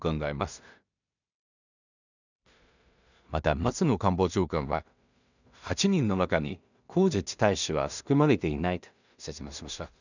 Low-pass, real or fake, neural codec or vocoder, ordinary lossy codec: 7.2 kHz; fake; codec, 16 kHz in and 24 kHz out, 0.4 kbps, LongCat-Audio-Codec, two codebook decoder; none